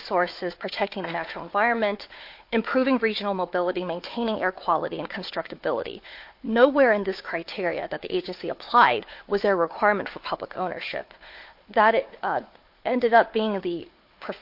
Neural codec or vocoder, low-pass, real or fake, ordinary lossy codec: codec, 16 kHz, 4 kbps, FunCodec, trained on Chinese and English, 50 frames a second; 5.4 kHz; fake; MP3, 32 kbps